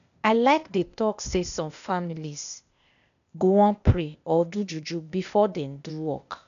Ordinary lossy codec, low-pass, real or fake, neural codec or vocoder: none; 7.2 kHz; fake; codec, 16 kHz, 0.8 kbps, ZipCodec